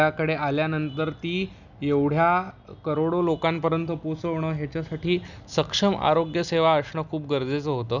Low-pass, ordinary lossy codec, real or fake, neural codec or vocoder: 7.2 kHz; none; real; none